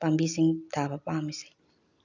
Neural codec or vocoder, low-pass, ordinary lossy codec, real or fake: none; 7.2 kHz; none; real